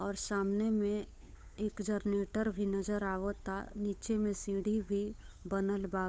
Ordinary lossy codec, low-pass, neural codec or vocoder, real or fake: none; none; codec, 16 kHz, 8 kbps, FunCodec, trained on Chinese and English, 25 frames a second; fake